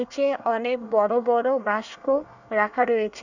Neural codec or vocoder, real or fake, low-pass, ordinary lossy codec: codec, 24 kHz, 1 kbps, SNAC; fake; 7.2 kHz; none